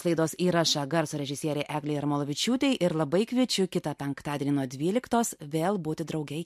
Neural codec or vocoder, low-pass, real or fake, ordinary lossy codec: none; 14.4 kHz; real; MP3, 64 kbps